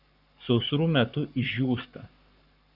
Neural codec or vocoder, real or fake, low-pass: codec, 44.1 kHz, 7.8 kbps, Pupu-Codec; fake; 5.4 kHz